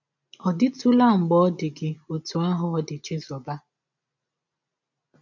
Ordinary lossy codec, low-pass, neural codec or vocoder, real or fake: none; 7.2 kHz; none; real